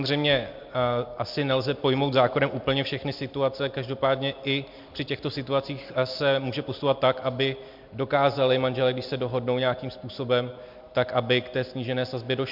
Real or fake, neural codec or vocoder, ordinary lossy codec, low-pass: real; none; MP3, 48 kbps; 5.4 kHz